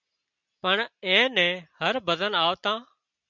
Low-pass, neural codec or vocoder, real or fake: 7.2 kHz; none; real